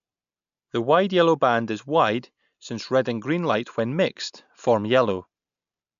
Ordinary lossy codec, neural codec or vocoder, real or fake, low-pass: none; none; real; 7.2 kHz